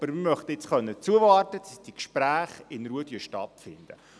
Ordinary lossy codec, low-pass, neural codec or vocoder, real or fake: none; none; none; real